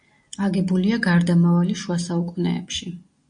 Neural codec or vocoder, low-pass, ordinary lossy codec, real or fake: none; 9.9 kHz; MP3, 48 kbps; real